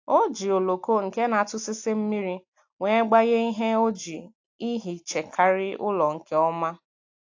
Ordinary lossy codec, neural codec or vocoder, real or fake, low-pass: AAC, 48 kbps; none; real; 7.2 kHz